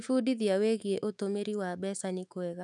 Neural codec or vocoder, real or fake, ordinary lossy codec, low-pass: autoencoder, 48 kHz, 128 numbers a frame, DAC-VAE, trained on Japanese speech; fake; none; 10.8 kHz